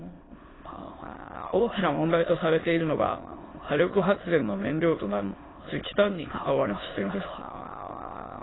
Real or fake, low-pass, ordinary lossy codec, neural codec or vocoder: fake; 7.2 kHz; AAC, 16 kbps; autoencoder, 22.05 kHz, a latent of 192 numbers a frame, VITS, trained on many speakers